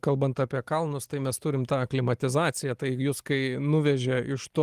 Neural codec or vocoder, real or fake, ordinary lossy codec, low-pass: none; real; Opus, 24 kbps; 14.4 kHz